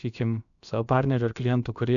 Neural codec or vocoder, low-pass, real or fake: codec, 16 kHz, about 1 kbps, DyCAST, with the encoder's durations; 7.2 kHz; fake